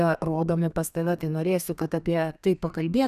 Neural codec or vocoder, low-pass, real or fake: codec, 32 kHz, 1.9 kbps, SNAC; 14.4 kHz; fake